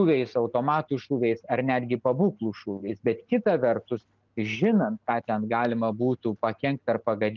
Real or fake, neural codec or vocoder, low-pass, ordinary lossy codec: real; none; 7.2 kHz; Opus, 24 kbps